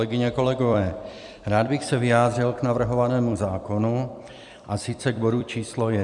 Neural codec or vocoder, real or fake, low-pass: vocoder, 44.1 kHz, 128 mel bands every 256 samples, BigVGAN v2; fake; 10.8 kHz